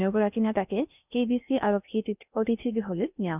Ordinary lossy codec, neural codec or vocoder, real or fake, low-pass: none; codec, 16 kHz in and 24 kHz out, 0.8 kbps, FocalCodec, streaming, 65536 codes; fake; 3.6 kHz